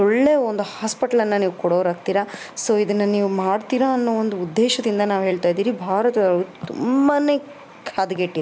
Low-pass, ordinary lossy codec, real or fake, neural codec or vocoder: none; none; real; none